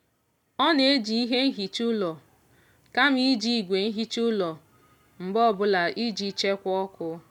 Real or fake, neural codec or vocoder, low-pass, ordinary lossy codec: real; none; 19.8 kHz; none